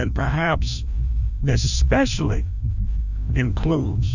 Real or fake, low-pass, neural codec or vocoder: fake; 7.2 kHz; codec, 16 kHz, 1 kbps, FreqCodec, larger model